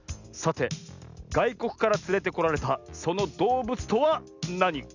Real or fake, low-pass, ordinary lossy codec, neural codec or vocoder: real; 7.2 kHz; none; none